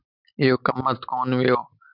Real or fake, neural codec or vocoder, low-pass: real; none; 5.4 kHz